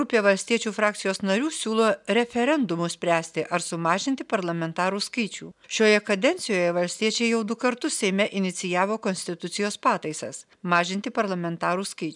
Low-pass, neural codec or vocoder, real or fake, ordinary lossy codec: 10.8 kHz; none; real; MP3, 96 kbps